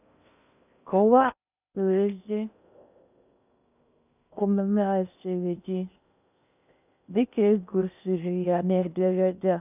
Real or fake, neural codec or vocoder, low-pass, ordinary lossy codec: fake; codec, 16 kHz in and 24 kHz out, 0.6 kbps, FocalCodec, streaming, 4096 codes; 3.6 kHz; none